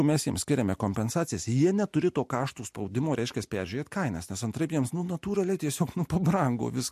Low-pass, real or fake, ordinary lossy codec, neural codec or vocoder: 14.4 kHz; real; MP3, 64 kbps; none